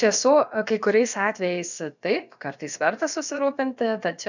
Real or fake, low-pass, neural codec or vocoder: fake; 7.2 kHz; codec, 16 kHz, about 1 kbps, DyCAST, with the encoder's durations